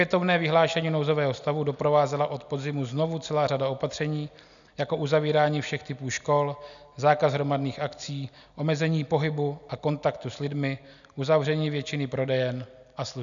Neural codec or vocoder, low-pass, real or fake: none; 7.2 kHz; real